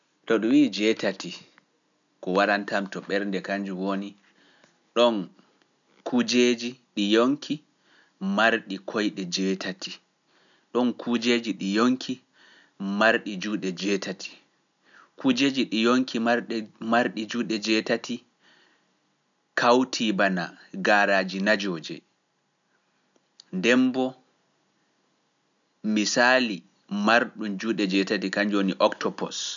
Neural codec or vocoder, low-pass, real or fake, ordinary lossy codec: none; 7.2 kHz; real; none